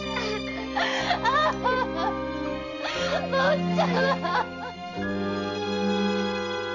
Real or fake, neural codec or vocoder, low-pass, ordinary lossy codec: real; none; 7.2 kHz; none